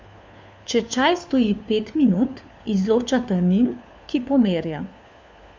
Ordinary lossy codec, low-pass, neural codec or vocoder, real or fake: none; none; codec, 16 kHz, 4 kbps, FunCodec, trained on LibriTTS, 50 frames a second; fake